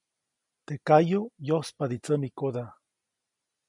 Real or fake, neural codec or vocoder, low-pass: real; none; 10.8 kHz